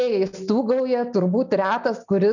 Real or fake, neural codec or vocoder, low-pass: real; none; 7.2 kHz